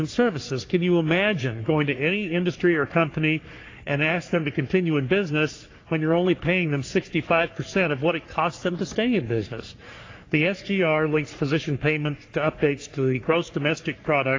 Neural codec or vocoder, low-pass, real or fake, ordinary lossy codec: codec, 44.1 kHz, 3.4 kbps, Pupu-Codec; 7.2 kHz; fake; AAC, 32 kbps